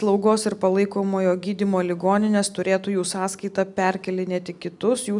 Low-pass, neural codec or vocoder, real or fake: 10.8 kHz; none; real